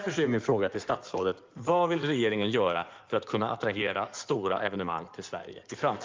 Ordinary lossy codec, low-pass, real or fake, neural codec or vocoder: Opus, 24 kbps; 7.2 kHz; fake; codec, 16 kHz in and 24 kHz out, 2.2 kbps, FireRedTTS-2 codec